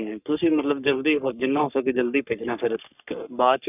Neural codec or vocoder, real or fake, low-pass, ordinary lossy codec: codec, 44.1 kHz, 3.4 kbps, Pupu-Codec; fake; 3.6 kHz; none